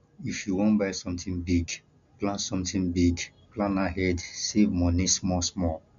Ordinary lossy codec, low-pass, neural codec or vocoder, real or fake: none; 7.2 kHz; none; real